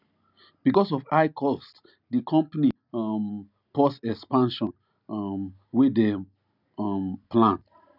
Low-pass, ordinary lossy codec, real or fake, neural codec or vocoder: 5.4 kHz; none; real; none